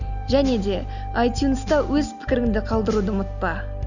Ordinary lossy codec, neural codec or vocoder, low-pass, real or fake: none; none; 7.2 kHz; real